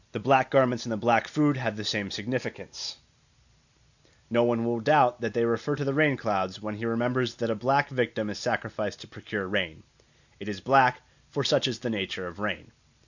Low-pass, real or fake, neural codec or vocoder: 7.2 kHz; real; none